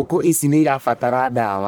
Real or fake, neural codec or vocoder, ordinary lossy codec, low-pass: fake; codec, 44.1 kHz, 1.7 kbps, Pupu-Codec; none; none